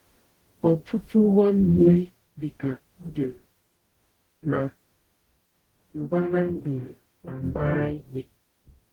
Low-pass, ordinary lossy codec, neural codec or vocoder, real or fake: 19.8 kHz; Opus, 16 kbps; codec, 44.1 kHz, 0.9 kbps, DAC; fake